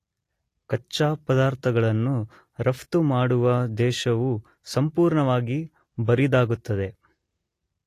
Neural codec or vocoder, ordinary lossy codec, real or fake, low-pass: none; AAC, 48 kbps; real; 14.4 kHz